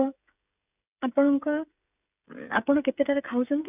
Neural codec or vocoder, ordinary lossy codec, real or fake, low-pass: codec, 16 kHz, 16 kbps, FreqCodec, smaller model; none; fake; 3.6 kHz